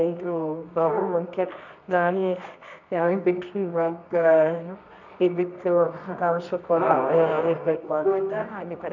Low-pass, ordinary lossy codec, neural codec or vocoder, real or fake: 7.2 kHz; none; codec, 24 kHz, 0.9 kbps, WavTokenizer, medium music audio release; fake